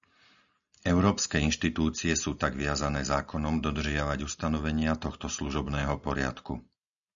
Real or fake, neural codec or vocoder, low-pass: real; none; 7.2 kHz